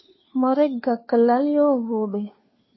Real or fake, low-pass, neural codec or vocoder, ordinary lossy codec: fake; 7.2 kHz; codec, 16 kHz, 2 kbps, FunCodec, trained on Chinese and English, 25 frames a second; MP3, 24 kbps